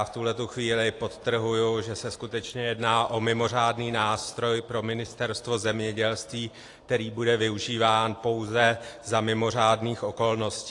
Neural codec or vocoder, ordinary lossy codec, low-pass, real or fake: none; AAC, 48 kbps; 10.8 kHz; real